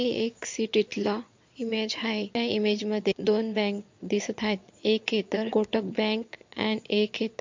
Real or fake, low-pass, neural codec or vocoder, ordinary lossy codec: fake; 7.2 kHz; vocoder, 22.05 kHz, 80 mel bands, Vocos; MP3, 48 kbps